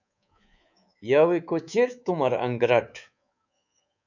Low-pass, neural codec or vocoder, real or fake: 7.2 kHz; codec, 24 kHz, 3.1 kbps, DualCodec; fake